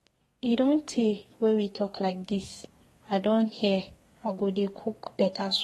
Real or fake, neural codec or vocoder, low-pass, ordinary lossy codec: fake; codec, 32 kHz, 1.9 kbps, SNAC; 14.4 kHz; AAC, 32 kbps